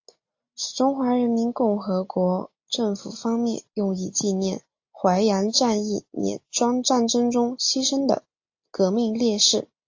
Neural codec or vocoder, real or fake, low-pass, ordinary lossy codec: none; real; 7.2 kHz; AAC, 48 kbps